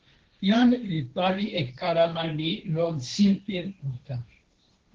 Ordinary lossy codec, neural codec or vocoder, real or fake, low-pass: Opus, 16 kbps; codec, 16 kHz, 1.1 kbps, Voila-Tokenizer; fake; 7.2 kHz